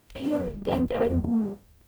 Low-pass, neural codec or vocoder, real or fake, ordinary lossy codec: none; codec, 44.1 kHz, 0.9 kbps, DAC; fake; none